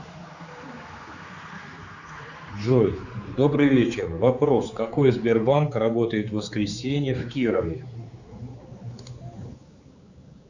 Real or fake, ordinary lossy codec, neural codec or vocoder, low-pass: fake; Opus, 64 kbps; codec, 16 kHz, 4 kbps, X-Codec, HuBERT features, trained on general audio; 7.2 kHz